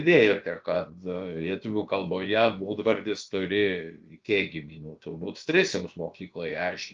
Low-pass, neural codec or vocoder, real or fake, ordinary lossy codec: 7.2 kHz; codec, 16 kHz, about 1 kbps, DyCAST, with the encoder's durations; fake; Opus, 24 kbps